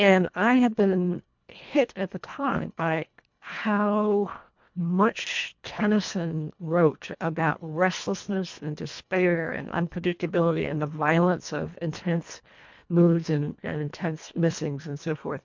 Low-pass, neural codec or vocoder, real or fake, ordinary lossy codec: 7.2 kHz; codec, 24 kHz, 1.5 kbps, HILCodec; fake; MP3, 64 kbps